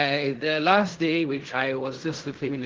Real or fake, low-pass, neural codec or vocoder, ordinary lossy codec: fake; 7.2 kHz; codec, 16 kHz in and 24 kHz out, 0.4 kbps, LongCat-Audio-Codec, fine tuned four codebook decoder; Opus, 16 kbps